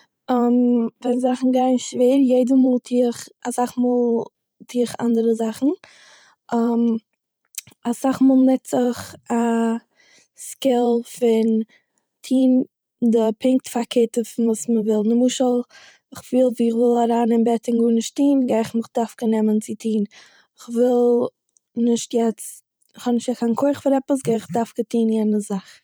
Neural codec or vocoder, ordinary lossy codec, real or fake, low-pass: vocoder, 44.1 kHz, 128 mel bands every 512 samples, BigVGAN v2; none; fake; none